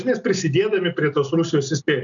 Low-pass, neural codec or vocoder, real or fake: 7.2 kHz; none; real